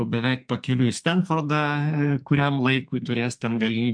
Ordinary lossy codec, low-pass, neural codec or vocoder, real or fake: MP3, 64 kbps; 9.9 kHz; codec, 44.1 kHz, 2.6 kbps, SNAC; fake